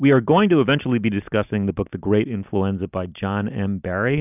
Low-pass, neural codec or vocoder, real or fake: 3.6 kHz; none; real